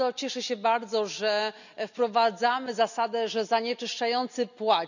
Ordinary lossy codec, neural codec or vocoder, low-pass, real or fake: none; none; 7.2 kHz; real